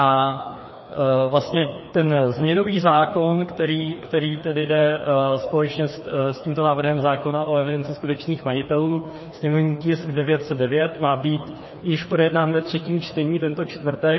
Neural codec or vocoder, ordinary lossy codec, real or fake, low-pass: codec, 16 kHz, 2 kbps, FreqCodec, larger model; MP3, 24 kbps; fake; 7.2 kHz